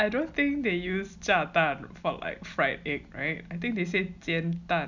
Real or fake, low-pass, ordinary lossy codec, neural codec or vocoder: real; 7.2 kHz; none; none